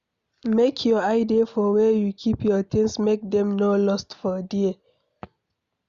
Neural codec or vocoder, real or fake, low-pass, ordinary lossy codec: none; real; 7.2 kHz; Opus, 64 kbps